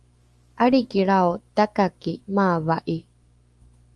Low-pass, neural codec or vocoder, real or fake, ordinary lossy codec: 10.8 kHz; autoencoder, 48 kHz, 128 numbers a frame, DAC-VAE, trained on Japanese speech; fake; Opus, 24 kbps